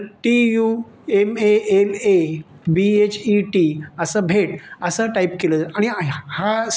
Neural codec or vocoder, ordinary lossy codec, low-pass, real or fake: none; none; none; real